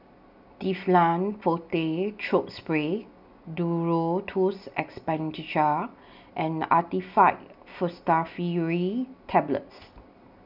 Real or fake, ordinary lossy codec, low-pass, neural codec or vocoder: real; none; 5.4 kHz; none